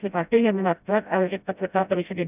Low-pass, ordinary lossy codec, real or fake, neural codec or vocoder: 3.6 kHz; none; fake; codec, 16 kHz, 0.5 kbps, FreqCodec, smaller model